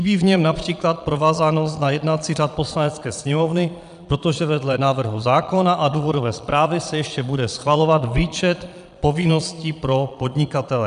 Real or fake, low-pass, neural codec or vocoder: fake; 9.9 kHz; vocoder, 22.05 kHz, 80 mel bands, Vocos